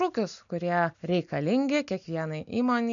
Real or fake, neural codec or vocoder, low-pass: real; none; 7.2 kHz